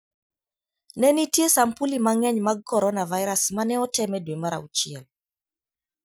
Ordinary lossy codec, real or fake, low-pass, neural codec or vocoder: none; real; none; none